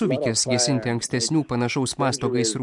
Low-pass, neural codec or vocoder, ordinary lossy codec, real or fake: 10.8 kHz; none; MP3, 64 kbps; real